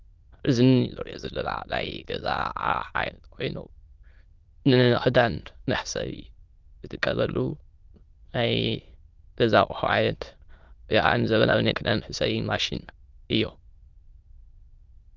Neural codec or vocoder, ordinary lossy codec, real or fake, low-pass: autoencoder, 22.05 kHz, a latent of 192 numbers a frame, VITS, trained on many speakers; Opus, 32 kbps; fake; 7.2 kHz